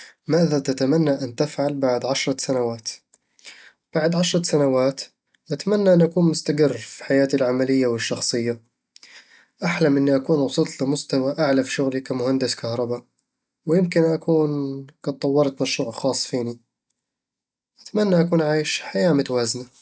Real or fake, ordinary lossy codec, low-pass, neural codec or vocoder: real; none; none; none